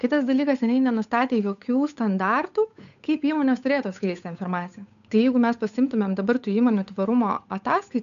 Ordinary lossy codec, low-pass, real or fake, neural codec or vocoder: MP3, 96 kbps; 7.2 kHz; fake; codec, 16 kHz, 8 kbps, FunCodec, trained on Chinese and English, 25 frames a second